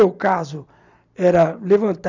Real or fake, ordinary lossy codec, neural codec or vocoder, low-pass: real; none; none; 7.2 kHz